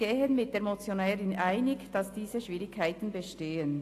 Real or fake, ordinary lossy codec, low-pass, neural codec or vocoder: real; AAC, 48 kbps; 14.4 kHz; none